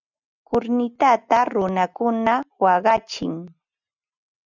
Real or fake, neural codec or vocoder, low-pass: real; none; 7.2 kHz